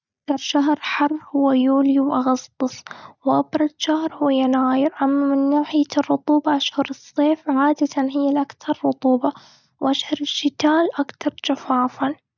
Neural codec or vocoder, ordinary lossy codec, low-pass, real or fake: none; none; 7.2 kHz; real